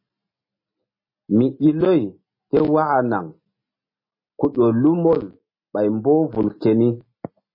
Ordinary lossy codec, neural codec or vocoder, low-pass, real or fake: MP3, 24 kbps; none; 5.4 kHz; real